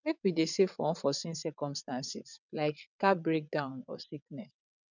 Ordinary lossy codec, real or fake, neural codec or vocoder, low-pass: none; fake; vocoder, 22.05 kHz, 80 mel bands, WaveNeXt; 7.2 kHz